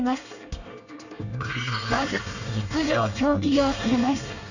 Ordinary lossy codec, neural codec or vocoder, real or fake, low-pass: none; codec, 24 kHz, 1 kbps, SNAC; fake; 7.2 kHz